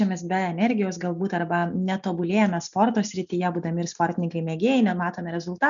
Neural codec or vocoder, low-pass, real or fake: none; 7.2 kHz; real